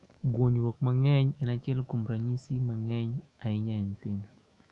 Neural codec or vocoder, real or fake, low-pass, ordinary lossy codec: autoencoder, 48 kHz, 128 numbers a frame, DAC-VAE, trained on Japanese speech; fake; 10.8 kHz; none